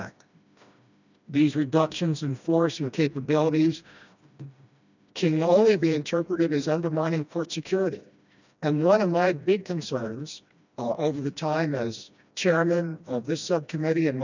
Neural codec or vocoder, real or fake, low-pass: codec, 16 kHz, 1 kbps, FreqCodec, smaller model; fake; 7.2 kHz